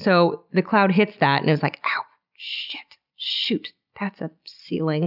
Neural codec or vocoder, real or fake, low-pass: none; real; 5.4 kHz